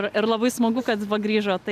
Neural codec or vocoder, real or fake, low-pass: none; real; 14.4 kHz